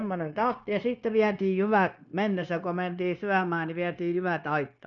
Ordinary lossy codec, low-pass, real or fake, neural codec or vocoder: none; 7.2 kHz; fake; codec, 16 kHz, 0.9 kbps, LongCat-Audio-Codec